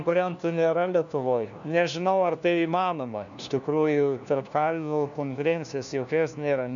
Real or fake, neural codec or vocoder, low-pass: fake; codec, 16 kHz, 1 kbps, FunCodec, trained on LibriTTS, 50 frames a second; 7.2 kHz